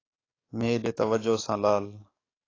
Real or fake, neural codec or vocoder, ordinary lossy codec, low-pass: fake; codec, 44.1 kHz, 7.8 kbps, DAC; AAC, 32 kbps; 7.2 kHz